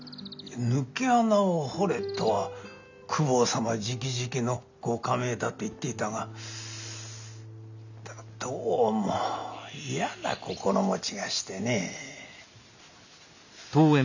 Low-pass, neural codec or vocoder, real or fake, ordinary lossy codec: 7.2 kHz; none; real; none